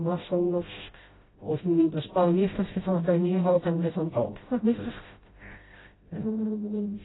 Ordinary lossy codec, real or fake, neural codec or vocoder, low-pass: AAC, 16 kbps; fake; codec, 16 kHz, 0.5 kbps, FreqCodec, smaller model; 7.2 kHz